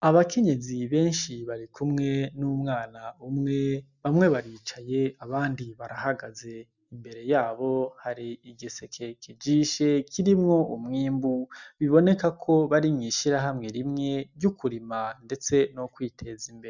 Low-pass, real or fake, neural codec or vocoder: 7.2 kHz; real; none